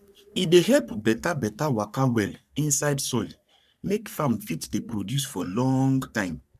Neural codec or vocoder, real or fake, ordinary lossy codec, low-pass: codec, 44.1 kHz, 3.4 kbps, Pupu-Codec; fake; none; 14.4 kHz